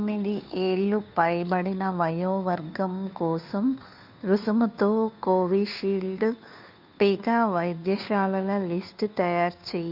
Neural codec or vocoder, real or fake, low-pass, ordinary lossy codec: codec, 16 kHz, 2 kbps, FunCodec, trained on Chinese and English, 25 frames a second; fake; 5.4 kHz; none